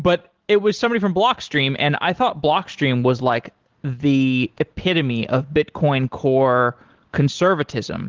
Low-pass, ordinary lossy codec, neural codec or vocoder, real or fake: 7.2 kHz; Opus, 16 kbps; none; real